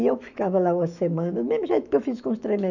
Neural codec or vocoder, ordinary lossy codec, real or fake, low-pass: autoencoder, 48 kHz, 128 numbers a frame, DAC-VAE, trained on Japanese speech; none; fake; 7.2 kHz